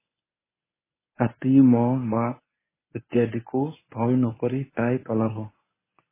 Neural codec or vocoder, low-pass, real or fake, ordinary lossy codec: codec, 24 kHz, 0.9 kbps, WavTokenizer, medium speech release version 1; 3.6 kHz; fake; MP3, 16 kbps